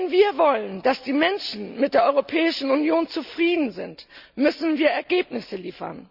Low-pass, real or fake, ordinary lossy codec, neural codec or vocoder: 5.4 kHz; real; none; none